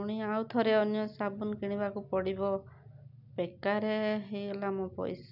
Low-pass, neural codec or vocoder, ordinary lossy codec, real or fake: 5.4 kHz; none; none; real